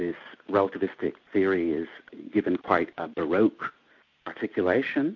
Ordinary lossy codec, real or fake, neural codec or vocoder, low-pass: AAC, 48 kbps; real; none; 7.2 kHz